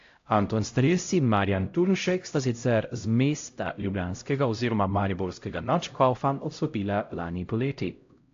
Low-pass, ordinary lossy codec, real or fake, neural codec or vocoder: 7.2 kHz; AAC, 48 kbps; fake; codec, 16 kHz, 0.5 kbps, X-Codec, HuBERT features, trained on LibriSpeech